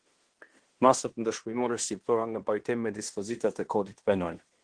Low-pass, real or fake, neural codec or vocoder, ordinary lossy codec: 9.9 kHz; fake; codec, 16 kHz in and 24 kHz out, 0.9 kbps, LongCat-Audio-Codec, fine tuned four codebook decoder; Opus, 16 kbps